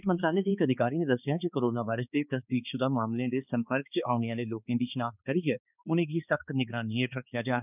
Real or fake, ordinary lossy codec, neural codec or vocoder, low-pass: fake; none; codec, 16 kHz, 2 kbps, X-Codec, HuBERT features, trained on balanced general audio; 3.6 kHz